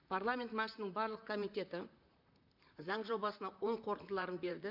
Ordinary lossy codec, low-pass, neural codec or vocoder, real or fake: MP3, 48 kbps; 5.4 kHz; vocoder, 44.1 kHz, 128 mel bands, Pupu-Vocoder; fake